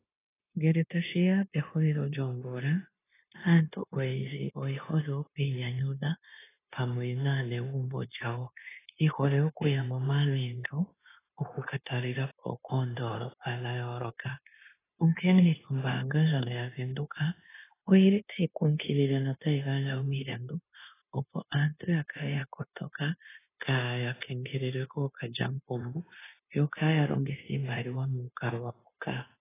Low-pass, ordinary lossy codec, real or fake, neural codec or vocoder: 3.6 kHz; AAC, 16 kbps; fake; codec, 16 kHz, 0.9 kbps, LongCat-Audio-Codec